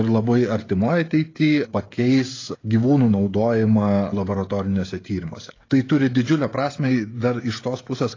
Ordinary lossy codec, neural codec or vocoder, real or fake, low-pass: AAC, 32 kbps; codec, 16 kHz, 16 kbps, FreqCodec, smaller model; fake; 7.2 kHz